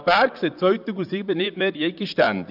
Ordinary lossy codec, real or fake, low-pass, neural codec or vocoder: none; fake; 5.4 kHz; vocoder, 44.1 kHz, 128 mel bands, Pupu-Vocoder